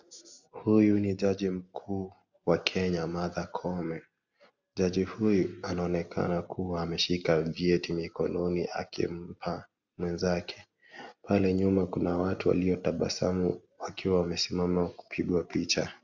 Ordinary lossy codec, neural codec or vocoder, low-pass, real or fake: Opus, 64 kbps; none; 7.2 kHz; real